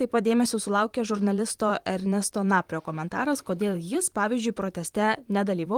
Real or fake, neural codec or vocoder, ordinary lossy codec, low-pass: fake; vocoder, 44.1 kHz, 128 mel bands, Pupu-Vocoder; Opus, 24 kbps; 19.8 kHz